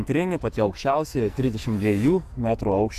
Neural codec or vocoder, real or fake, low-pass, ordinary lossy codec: codec, 44.1 kHz, 2.6 kbps, SNAC; fake; 14.4 kHz; MP3, 96 kbps